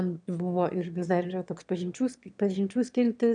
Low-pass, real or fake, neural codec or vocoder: 9.9 kHz; fake; autoencoder, 22.05 kHz, a latent of 192 numbers a frame, VITS, trained on one speaker